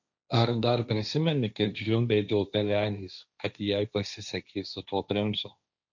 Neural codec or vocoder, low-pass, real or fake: codec, 16 kHz, 1.1 kbps, Voila-Tokenizer; 7.2 kHz; fake